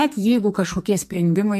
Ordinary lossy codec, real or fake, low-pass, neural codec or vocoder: MP3, 64 kbps; fake; 14.4 kHz; codec, 44.1 kHz, 2.6 kbps, SNAC